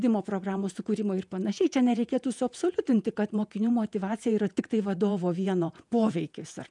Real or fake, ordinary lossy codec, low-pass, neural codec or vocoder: fake; MP3, 96 kbps; 10.8 kHz; vocoder, 48 kHz, 128 mel bands, Vocos